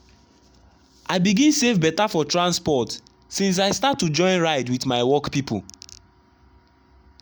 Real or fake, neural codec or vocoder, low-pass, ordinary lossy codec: real; none; none; none